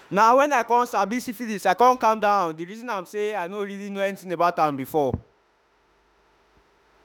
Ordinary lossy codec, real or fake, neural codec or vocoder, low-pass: none; fake; autoencoder, 48 kHz, 32 numbers a frame, DAC-VAE, trained on Japanese speech; none